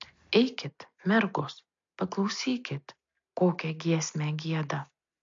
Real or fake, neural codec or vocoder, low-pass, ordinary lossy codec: real; none; 7.2 kHz; MP3, 48 kbps